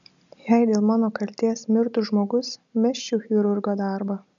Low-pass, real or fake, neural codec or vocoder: 7.2 kHz; real; none